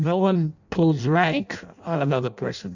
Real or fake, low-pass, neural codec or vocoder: fake; 7.2 kHz; codec, 16 kHz in and 24 kHz out, 0.6 kbps, FireRedTTS-2 codec